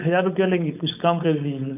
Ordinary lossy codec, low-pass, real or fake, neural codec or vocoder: none; 3.6 kHz; fake; codec, 16 kHz, 4.8 kbps, FACodec